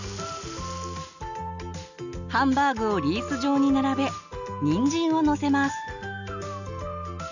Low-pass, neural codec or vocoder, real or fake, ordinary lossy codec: 7.2 kHz; none; real; none